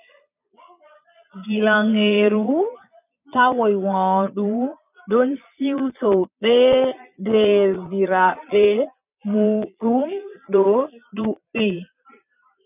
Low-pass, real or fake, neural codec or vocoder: 3.6 kHz; fake; vocoder, 22.05 kHz, 80 mel bands, Vocos